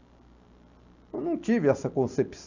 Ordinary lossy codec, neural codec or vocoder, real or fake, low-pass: none; none; real; 7.2 kHz